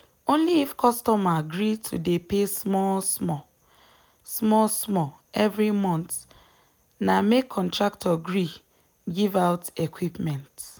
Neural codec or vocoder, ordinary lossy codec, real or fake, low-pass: none; none; real; none